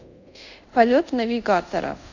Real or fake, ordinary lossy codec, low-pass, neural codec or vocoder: fake; none; 7.2 kHz; codec, 24 kHz, 0.5 kbps, DualCodec